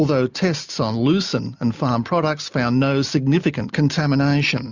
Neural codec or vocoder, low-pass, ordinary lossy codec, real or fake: none; 7.2 kHz; Opus, 64 kbps; real